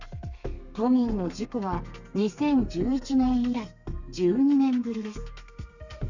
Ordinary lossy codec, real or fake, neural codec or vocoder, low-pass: none; fake; codec, 32 kHz, 1.9 kbps, SNAC; 7.2 kHz